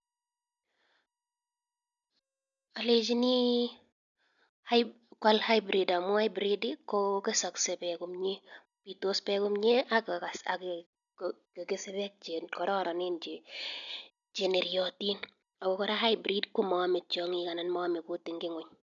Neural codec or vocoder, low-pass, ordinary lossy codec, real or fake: none; 7.2 kHz; none; real